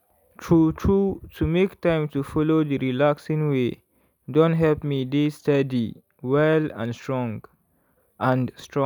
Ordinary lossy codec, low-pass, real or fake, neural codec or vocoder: none; 19.8 kHz; real; none